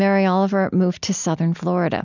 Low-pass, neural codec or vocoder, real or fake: 7.2 kHz; none; real